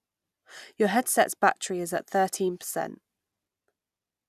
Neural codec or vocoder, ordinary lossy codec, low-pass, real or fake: none; none; 14.4 kHz; real